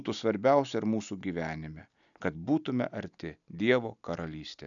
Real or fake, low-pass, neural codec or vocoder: real; 7.2 kHz; none